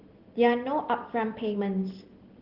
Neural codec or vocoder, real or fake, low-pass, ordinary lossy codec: none; real; 5.4 kHz; Opus, 16 kbps